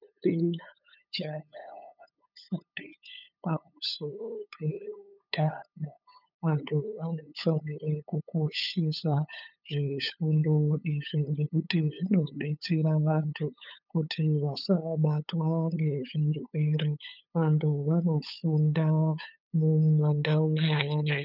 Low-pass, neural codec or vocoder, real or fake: 5.4 kHz; codec, 16 kHz, 8 kbps, FunCodec, trained on LibriTTS, 25 frames a second; fake